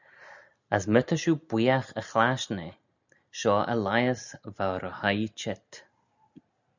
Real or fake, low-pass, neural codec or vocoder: real; 7.2 kHz; none